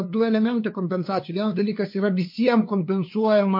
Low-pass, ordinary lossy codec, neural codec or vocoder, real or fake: 5.4 kHz; MP3, 32 kbps; codec, 16 kHz, 2 kbps, FunCodec, trained on LibriTTS, 25 frames a second; fake